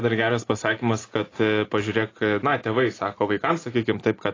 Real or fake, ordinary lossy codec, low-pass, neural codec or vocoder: real; AAC, 32 kbps; 7.2 kHz; none